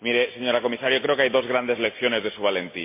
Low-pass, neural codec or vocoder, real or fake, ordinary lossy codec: 3.6 kHz; none; real; MP3, 24 kbps